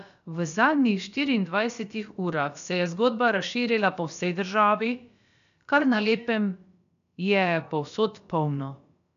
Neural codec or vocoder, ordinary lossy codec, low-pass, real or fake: codec, 16 kHz, about 1 kbps, DyCAST, with the encoder's durations; none; 7.2 kHz; fake